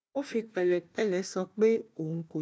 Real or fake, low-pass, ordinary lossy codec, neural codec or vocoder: fake; none; none; codec, 16 kHz, 1 kbps, FunCodec, trained on Chinese and English, 50 frames a second